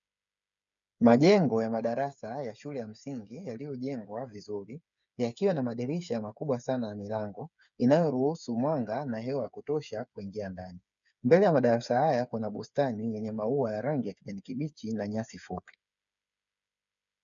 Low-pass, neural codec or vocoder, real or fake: 7.2 kHz; codec, 16 kHz, 8 kbps, FreqCodec, smaller model; fake